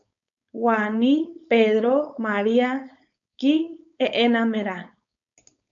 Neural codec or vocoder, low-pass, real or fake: codec, 16 kHz, 4.8 kbps, FACodec; 7.2 kHz; fake